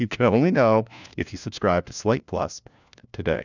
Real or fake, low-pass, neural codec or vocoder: fake; 7.2 kHz; codec, 16 kHz, 1 kbps, FunCodec, trained on LibriTTS, 50 frames a second